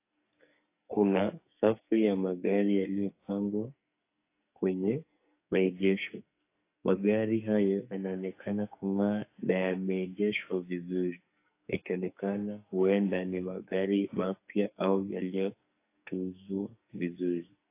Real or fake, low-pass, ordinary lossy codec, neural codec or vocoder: fake; 3.6 kHz; AAC, 24 kbps; codec, 44.1 kHz, 2.6 kbps, SNAC